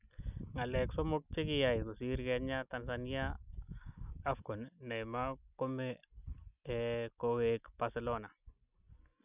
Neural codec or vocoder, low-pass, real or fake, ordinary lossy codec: none; 3.6 kHz; real; none